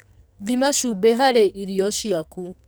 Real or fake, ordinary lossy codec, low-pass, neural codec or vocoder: fake; none; none; codec, 44.1 kHz, 2.6 kbps, SNAC